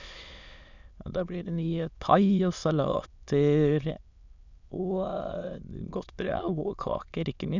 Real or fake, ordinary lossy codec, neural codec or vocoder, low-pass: fake; none; autoencoder, 22.05 kHz, a latent of 192 numbers a frame, VITS, trained on many speakers; 7.2 kHz